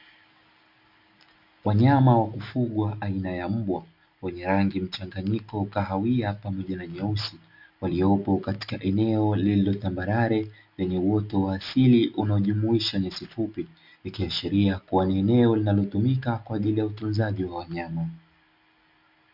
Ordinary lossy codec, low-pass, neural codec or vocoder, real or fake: MP3, 48 kbps; 5.4 kHz; none; real